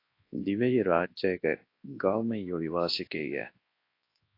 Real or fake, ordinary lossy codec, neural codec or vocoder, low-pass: fake; AAC, 32 kbps; codec, 24 kHz, 0.9 kbps, WavTokenizer, large speech release; 5.4 kHz